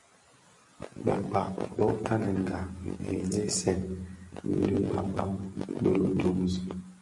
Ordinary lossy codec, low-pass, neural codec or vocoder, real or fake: MP3, 48 kbps; 10.8 kHz; none; real